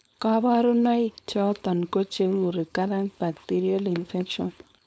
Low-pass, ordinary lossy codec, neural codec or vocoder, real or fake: none; none; codec, 16 kHz, 4.8 kbps, FACodec; fake